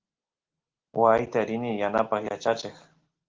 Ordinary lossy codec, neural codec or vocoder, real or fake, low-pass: Opus, 16 kbps; none; real; 7.2 kHz